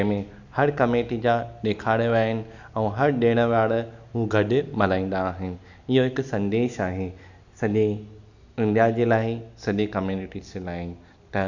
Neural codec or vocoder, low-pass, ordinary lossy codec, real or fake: none; 7.2 kHz; none; real